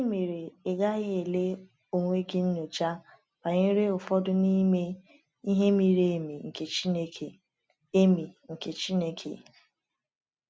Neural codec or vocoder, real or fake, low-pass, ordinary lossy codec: none; real; none; none